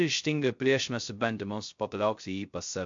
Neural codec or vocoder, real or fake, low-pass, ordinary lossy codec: codec, 16 kHz, 0.2 kbps, FocalCodec; fake; 7.2 kHz; MP3, 48 kbps